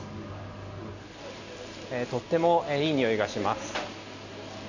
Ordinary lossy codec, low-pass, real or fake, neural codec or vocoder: none; 7.2 kHz; real; none